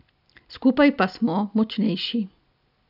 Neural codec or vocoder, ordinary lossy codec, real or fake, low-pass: none; none; real; 5.4 kHz